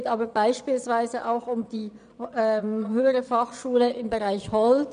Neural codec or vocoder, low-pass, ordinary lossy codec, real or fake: vocoder, 22.05 kHz, 80 mel bands, Vocos; 9.9 kHz; none; fake